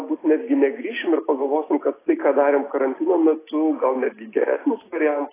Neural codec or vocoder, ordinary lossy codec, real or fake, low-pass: none; AAC, 16 kbps; real; 3.6 kHz